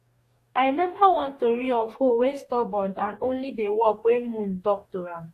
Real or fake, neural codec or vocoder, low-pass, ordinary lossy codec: fake; codec, 44.1 kHz, 2.6 kbps, DAC; 14.4 kHz; AAC, 64 kbps